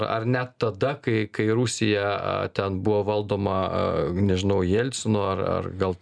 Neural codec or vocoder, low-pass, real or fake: none; 9.9 kHz; real